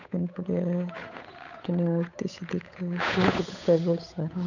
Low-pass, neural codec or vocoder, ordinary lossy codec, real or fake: 7.2 kHz; codec, 16 kHz, 8 kbps, FunCodec, trained on Chinese and English, 25 frames a second; none; fake